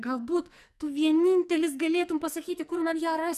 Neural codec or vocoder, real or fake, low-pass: codec, 44.1 kHz, 2.6 kbps, SNAC; fake; 14.4 kHz